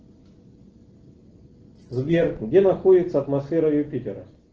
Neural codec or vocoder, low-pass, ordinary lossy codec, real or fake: none; 7.2 kHz; Opus, 16 kbps; real